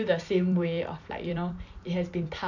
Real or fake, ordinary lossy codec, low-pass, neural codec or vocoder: fake; none; 7.2 kHz; vocoder, 44.1 kHz, 128 mel bands every 256 samples, BigVGAN v2